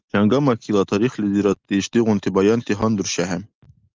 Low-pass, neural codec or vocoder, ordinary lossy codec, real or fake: 7.2 kHz; none; Opus, 24 kbps; real